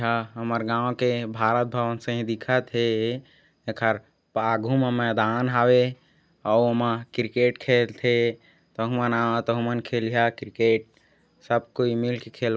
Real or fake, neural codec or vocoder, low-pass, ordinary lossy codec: real; none; none; none